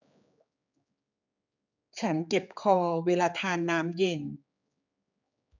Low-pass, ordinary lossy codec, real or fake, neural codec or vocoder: 7.2 kHz; none; fake; codec, 16 kHz, 4 kbps, X-Codec, HuBERT features, trained on general audio